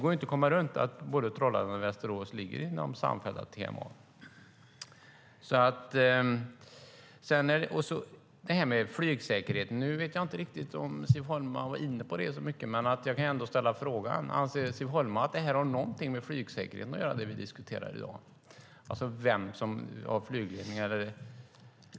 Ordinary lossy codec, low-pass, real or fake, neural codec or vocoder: none; none; real; none